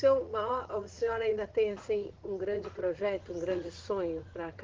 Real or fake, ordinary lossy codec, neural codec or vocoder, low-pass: fake; Opus, 16 kbps; vocoder, 44.1 kHz, 80 mel bands, Vocos; 7.2 kHz